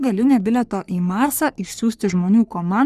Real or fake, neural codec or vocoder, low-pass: fake; codec, 44.1 kHz, 3.4 kbps, Pupu-Codec; 14.4 kHz